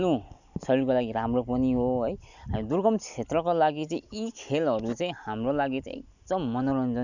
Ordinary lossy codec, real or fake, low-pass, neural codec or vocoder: none; real; 7.2 kHz; none